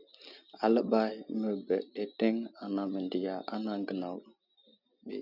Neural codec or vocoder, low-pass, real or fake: none; 5.4 kHz; real